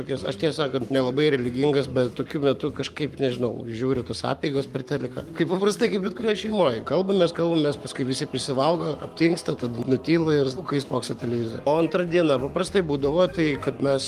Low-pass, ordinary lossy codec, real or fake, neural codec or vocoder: 14.4 kHz; Opus, 32 kbps; fake; codec, 44.1 kHz, 7.8 kbps, Pupu-Codec